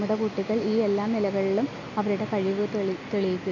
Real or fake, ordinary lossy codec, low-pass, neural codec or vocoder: real; none; 7.2 kHz; none